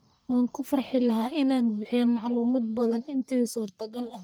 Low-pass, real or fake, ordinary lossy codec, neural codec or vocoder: none; fake; none; codec, 44.1 kHz, 1.7 kbps, Pupu-Codec